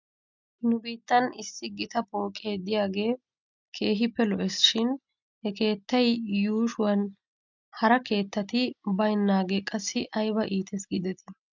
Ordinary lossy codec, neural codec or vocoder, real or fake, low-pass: MP3, 64 kbps; none; real; 7.2 kHz